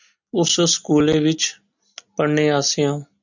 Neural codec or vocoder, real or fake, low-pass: none; real; 7.2 kHz